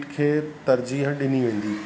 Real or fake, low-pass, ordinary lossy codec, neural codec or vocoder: real; none; none; none